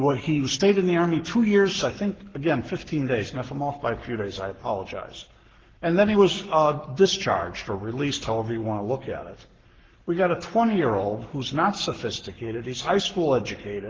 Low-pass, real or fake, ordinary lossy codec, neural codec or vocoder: 7.2 kHz; fake; Opus, 16 kbps; codec, 44.1 kHz, 7.8 kbps, Pupu-Codec